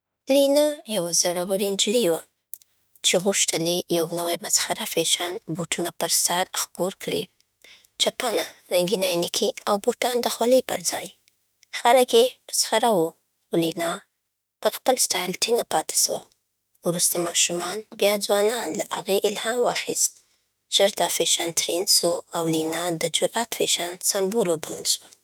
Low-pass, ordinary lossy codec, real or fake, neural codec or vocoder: none; none; fake; autoencoder, 48 kHz, 32 numbers a frame, DAC-VAE, trained on Japanese speech